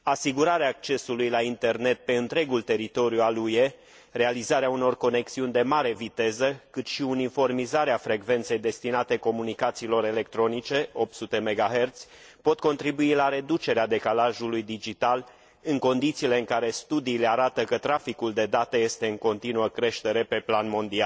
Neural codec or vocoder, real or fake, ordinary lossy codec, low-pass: none; real; none; none